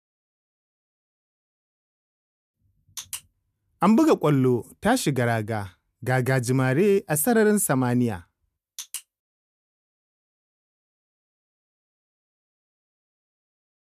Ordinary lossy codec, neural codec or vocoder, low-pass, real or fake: none; none; 14.4 kHz; real